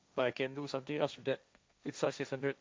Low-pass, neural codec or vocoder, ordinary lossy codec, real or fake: none; codec, 16 kHz, 1.1 kbps, Voila-Tokenizer; none; fake